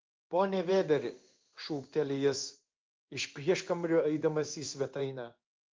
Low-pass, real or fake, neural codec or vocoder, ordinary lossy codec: 7.2 kHz; fake; codec, 16 kHz in and 24 kHz out, 1 kbps, XY-Tokenizer; Opus, 24 kbps